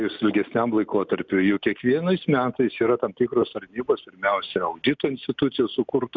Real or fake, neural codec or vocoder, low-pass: real; none; 7.2 kHz